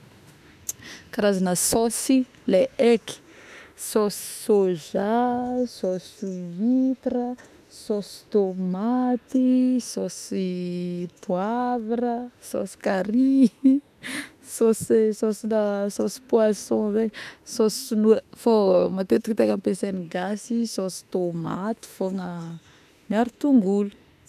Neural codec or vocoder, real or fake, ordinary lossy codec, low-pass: autoencoder, 48 kHz, 32 numbers a frame, DAC-VAE, trained on Japanese speech; fake; none; 14.4 kHz